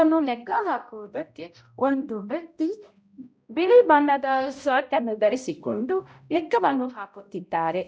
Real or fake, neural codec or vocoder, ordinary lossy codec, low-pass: fake; codec, 16 kHz, 0.5 kbps, X-Codec, HuBERT features, trained on balanced general audio; none; none